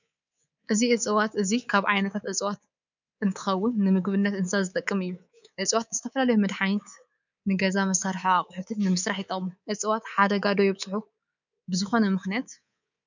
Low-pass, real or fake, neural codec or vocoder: 7.2 kHz; fake; codec, 24 kHz, 3.1 kbps, DualCodec